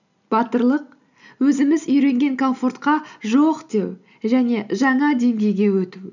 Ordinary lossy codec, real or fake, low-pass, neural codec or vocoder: none; real; 7.2 kHz; none